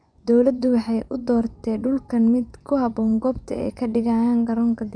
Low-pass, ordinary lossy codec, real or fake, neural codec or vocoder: 9.9 kHz; none; fake; vocoder, 24 kHz, 100 mel bands, Vocos